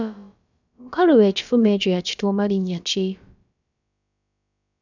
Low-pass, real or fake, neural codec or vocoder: 7.2 kHz; fake; codec, 16 kHz, about 1 kbps, DyCAST, with the encoder's durations